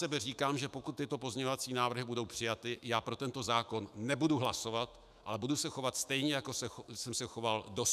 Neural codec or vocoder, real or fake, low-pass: autoencoder, 48 kHz, 128 numbers a frame, DAC-VAE, trained on Japanese speech; fake; 14.4 kHz